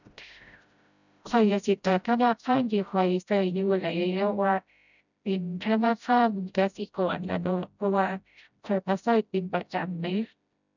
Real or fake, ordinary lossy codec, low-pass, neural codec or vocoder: fake; none; 7.2 kHz; codec, 16 kHz, 0.5 kbps, FreqCodec, smaller model